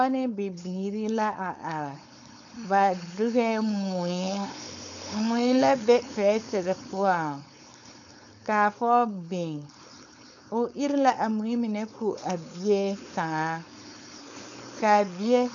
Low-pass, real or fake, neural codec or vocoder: 7.2 kHz; fake; codec, 16 kHz, 4.8 kbps, FACodec